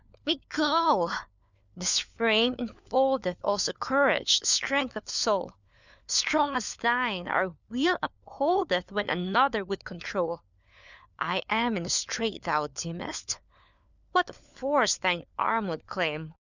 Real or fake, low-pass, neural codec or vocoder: fake; 7.2 kHz; codec, 16 kHz, 4 kbps, FunCodec, trained on LibriTTS, 50 frames a second